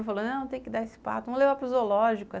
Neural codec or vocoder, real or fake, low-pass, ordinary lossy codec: none; real; none; none